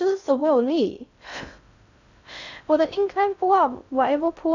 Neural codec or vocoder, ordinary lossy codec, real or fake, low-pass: codec, 16 kHz in and 24 kHz out, 0.6 kbps, FocalCodec, streaming, 2048 codes; none; fake; 7.2 kHz